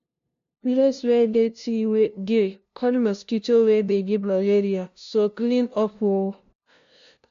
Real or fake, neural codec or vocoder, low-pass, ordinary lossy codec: fake; codec, 16 kHz, 0.5 kbps, FunCodec, trained on LibriTTS, 25 frames a second; 7.2 kHz; AAC, 64 kbps